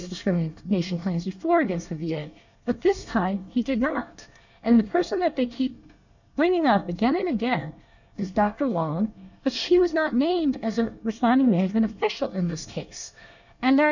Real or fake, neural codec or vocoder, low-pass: fake; codec, 24 kHz, 1 kbps, SNAC; 7.2 kHz